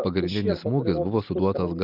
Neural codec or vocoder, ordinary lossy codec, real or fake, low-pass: none; Opus, 24 kbps; real; 5.4 kHz